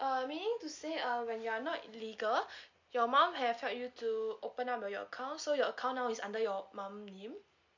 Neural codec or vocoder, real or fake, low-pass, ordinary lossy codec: none; real; 7.2 kHz; MP3, 48 kbps